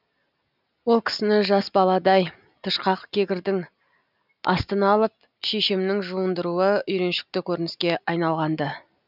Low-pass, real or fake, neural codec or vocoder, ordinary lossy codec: 5.4 kHz; real; none; none